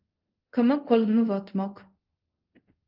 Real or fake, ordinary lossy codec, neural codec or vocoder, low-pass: fake; Opus, 24 kbps; codec, 24 kHz, 0.5 kbps, DualCodec; 5.4 kHz